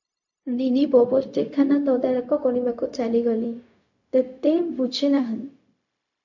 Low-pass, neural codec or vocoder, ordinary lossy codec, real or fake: 7.2 kHz; codec, 16 kHz, 0.4 kbps, LongCat-Audio-Codec; AAC, 48 kbps; fake